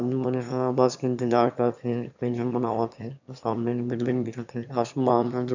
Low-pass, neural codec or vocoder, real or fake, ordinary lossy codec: 7.2 kHz; autoencoder, 22.05 kHz, a latent of 192 numbers a frame, VITS, trained on one speaker; fake; none